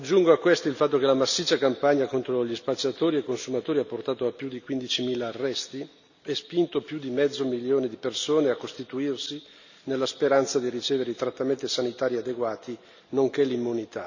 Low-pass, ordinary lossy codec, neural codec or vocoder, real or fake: 7.2 kHz; none; none; real